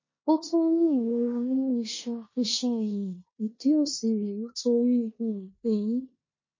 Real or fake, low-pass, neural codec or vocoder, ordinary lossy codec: fake; 7.2 kHz; codec, 16 kHz in and 24 kHz out, 0.9 kbps, LongCat-Audio-Codec, four codebook decoder; MP3, 32 kbps